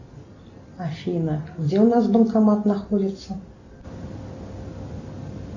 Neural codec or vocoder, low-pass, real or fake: none; 7.2 kHz; real